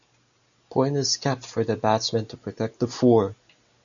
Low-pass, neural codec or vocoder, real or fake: 7.2 kHz; none; real